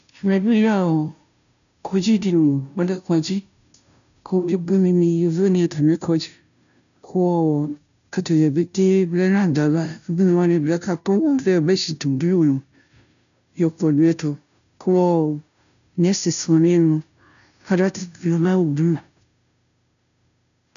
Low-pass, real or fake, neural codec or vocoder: 7.2 kHz; fake; codec, 16 kHz, 0.5 kbps, FunCodec, trained on Chinese and English, 25 frames a second